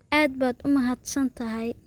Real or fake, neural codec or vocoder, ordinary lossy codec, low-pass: real; none; Opus, 24 kbps; 19.8 kHz